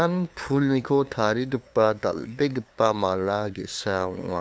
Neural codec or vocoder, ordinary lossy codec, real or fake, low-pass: codec, 16 kHz, 2 kbps, FunCodec, trained on LibriTTS, 25 frames a second; none; fake; none